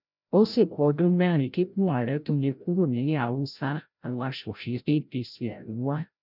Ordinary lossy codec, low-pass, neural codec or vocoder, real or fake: none; 5.4 kHz; codec, 16 kHz, 0.5 kbps, FreqCodec, larger model; fake